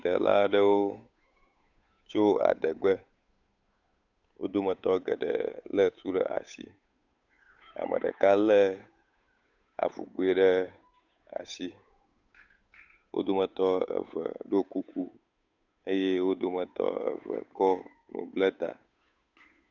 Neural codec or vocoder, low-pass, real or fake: codec, 16 kHz, 8 kbps, FunCodec, trained on Chinese and English, 25 frames a second; 7.2 kHz; fake